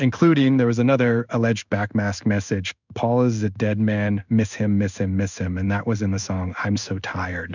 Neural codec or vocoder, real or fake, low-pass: codec, 16 kHz in and 24 kHz out, 1 kbps, XY-Tokenizer; fake; 7.2 kHz